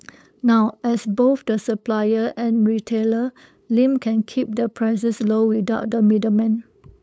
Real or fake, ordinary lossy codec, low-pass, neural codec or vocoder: fake; none; none; codec, 16 kHz, 8 kbps, FunCodec, trained on LibriTTS, 25 frames a second